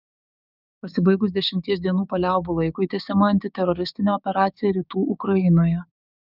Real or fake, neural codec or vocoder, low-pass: fake; codec, 16 kHz, 6 kbps, DAC; 5.4 kHz